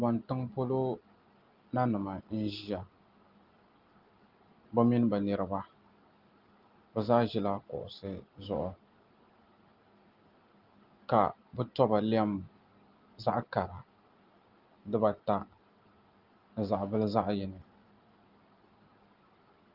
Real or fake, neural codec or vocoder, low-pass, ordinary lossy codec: real; none; 5.4 kHz; Opus, 32 kbps